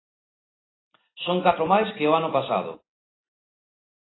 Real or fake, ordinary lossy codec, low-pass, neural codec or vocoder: real; AAC, 16 kbps; 7.2 kHz; none